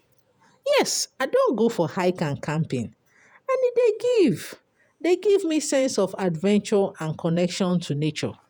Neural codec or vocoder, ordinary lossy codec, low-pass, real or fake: vocoder, 48 kHz, 128 mel bands, Vocos; none; none; fake